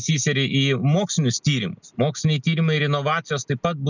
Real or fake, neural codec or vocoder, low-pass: real; none; 7.2 kHz